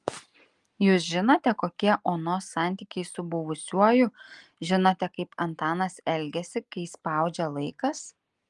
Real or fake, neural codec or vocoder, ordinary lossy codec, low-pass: real; none; Opus, 32 kbps; 10.8 kHz